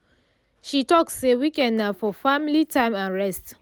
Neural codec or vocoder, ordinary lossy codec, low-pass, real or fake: none; none; none; real